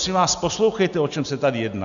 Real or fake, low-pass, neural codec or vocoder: real; 7.2 kHz; none